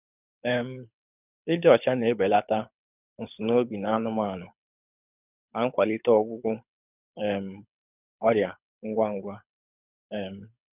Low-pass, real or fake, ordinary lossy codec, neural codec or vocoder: 3.6 kHz; fake; none; codec, 24 kHz, 6 kbps, HILCodec